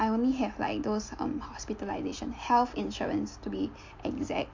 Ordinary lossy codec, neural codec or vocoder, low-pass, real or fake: MP3, 48 kbps; none; 7.2 kHz; real